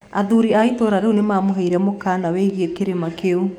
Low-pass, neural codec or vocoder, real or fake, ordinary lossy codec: 19.8 kHz; codec, 44.1 kHz, 7.8 kbps, DAC; fake; none